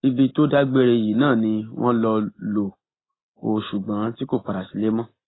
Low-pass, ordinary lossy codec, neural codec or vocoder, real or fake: 7.2 kHz; AAC, 16 kbps; none; real